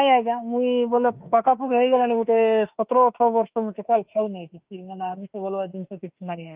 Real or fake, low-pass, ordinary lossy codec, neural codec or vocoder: fake; 3.6 kHz; Opus, 32 kbps; autoencoder, 48 kHz, 32 numbers a frame, DAC-VAE, trained on Japanese speech